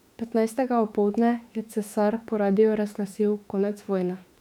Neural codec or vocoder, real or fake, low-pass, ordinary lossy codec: autoencoder, 48 kHz, 32 numbers a frame, DAC-VAE, trained on Japanese speech; fake; 19.8 kHz; none